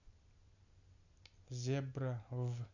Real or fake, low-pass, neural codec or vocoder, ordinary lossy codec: real; 7.2 kHz; none; none